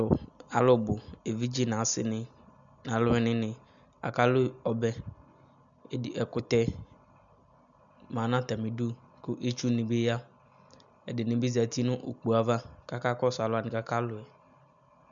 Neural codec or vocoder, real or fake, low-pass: none; real; 7.2 kHz